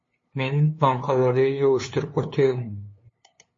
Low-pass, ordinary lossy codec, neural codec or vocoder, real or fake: 7.2 kHz; MP3, 32 kbps; codec, 16 kHz, 2 kbps, FunCodec, trained on LibriTTS, 25 frames a second; fake